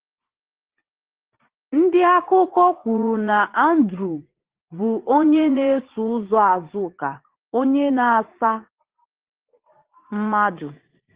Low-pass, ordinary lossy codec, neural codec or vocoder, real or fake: 3.6 kHz; Opus, 16 kbps; vocoder, 44.1 kHz, 80 mel bands, Vocos; fake